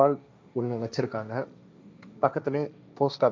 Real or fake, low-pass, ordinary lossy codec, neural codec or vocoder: fake; none; none; codec, 16 kHz, 1.1 kbps, Voila-Tokenizer